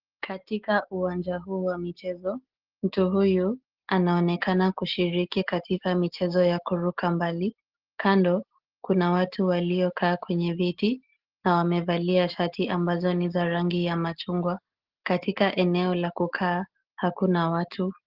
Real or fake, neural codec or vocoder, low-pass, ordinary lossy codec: real; none; 5.4 kHz; Opus, 16 kbps